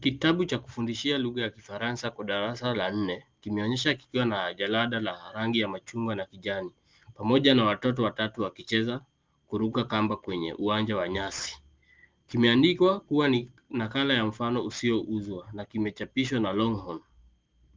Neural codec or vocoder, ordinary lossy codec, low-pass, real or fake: none; Opus, 32 kbps; 7.2 kHz; real